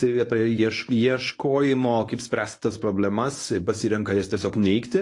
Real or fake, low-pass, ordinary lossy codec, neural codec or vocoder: fake; 10.8 kHz; AAC, 48 kbps; codec, 24 kHz, 0.9 kbps, WavTokenizer, medium speech release version 1